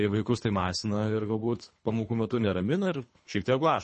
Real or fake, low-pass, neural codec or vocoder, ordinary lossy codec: fake; 10.8 kHz; codec, 24 kHz, 3 kbps, HILCodec; MP3, 32 kbps